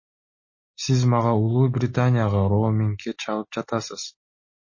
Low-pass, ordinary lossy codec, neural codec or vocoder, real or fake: 7.2 kHz; MP3, 32 kbps; none; real